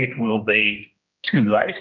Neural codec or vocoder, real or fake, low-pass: codec, 16 kHz, 1 kbps, X-Codec, HuBERT features, trained on general audio; fake; 7.2 kHz